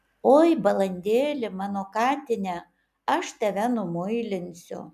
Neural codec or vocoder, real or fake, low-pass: none; real; 14.4 kHz